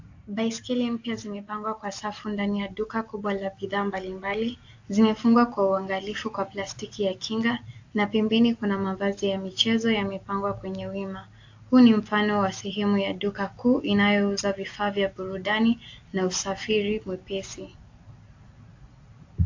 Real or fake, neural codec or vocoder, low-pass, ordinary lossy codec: real; none; 7.2 kHz; AAC, 48 kbps